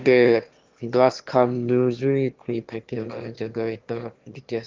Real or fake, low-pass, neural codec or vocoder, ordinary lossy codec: fake; 7.2 kHz; autoencoder, 22.05 kHz, a latent of 192 numbers a frame, VITS, trained on one speaker; Opus, 32 kbps